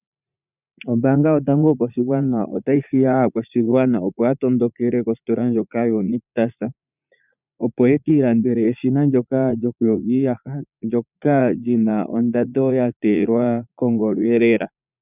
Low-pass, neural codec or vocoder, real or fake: 3.6 kHz; vocoder, 44.1 kHz, 80 mel bands, Vocos; fake